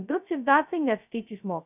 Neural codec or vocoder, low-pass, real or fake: codec, 16 kHz, 0.2 kbps, FocalCodec; 3.6 kHz; fake